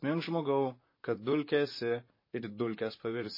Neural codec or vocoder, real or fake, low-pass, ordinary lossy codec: vocoder, 44.1 kHz, 128 mel bands, Pupu-Vocoder; fake; 5.4 kHz; MP3, 24 kbps